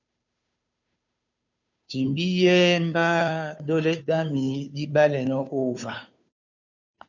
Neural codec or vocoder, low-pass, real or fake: codec, 16 kHz, 2 kbps, FunCodec, trained on Chinese and English, 25 frames a second; 7.2 kHz; fake